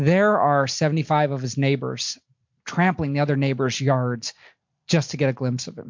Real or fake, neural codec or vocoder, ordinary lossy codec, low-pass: real; none; MP3, 48 kbps; 7.2 kHz